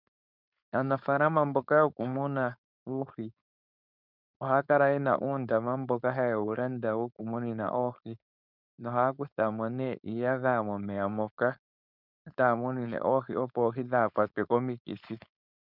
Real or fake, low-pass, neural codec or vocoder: fake; 5.4 kHz; codec, 16 kHz, 4.8 kbps, FACodec